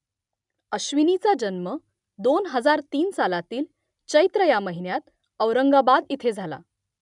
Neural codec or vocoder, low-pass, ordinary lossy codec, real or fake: none; 10.8 kHz; none; real